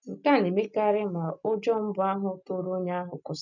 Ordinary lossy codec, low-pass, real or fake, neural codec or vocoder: none; 7.2 kHz; real; none